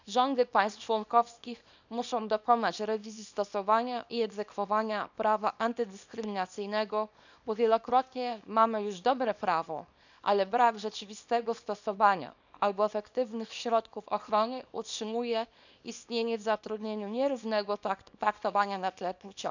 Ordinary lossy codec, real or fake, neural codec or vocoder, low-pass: none; fake; codec, 24 kHz, 0.9 kbps, WavTokenizer, small release; 7.2 kHz